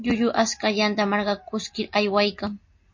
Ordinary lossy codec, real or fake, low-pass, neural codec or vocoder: MP3, 32 kbps; real; 7.2 kHz; none